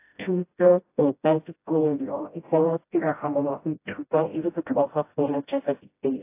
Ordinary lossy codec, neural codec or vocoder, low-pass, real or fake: AAC, 24 kbps; codec, 16 kHz, 0.5 kbps, FreqCodec, smaller model; 3.6 kHz; fake